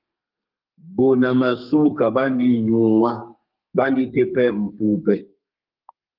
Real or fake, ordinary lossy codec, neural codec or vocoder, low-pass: fake; Opus, 24 kbps; codec, 32 kHz, 1.9 kbps, SNAC; 5.4 kHz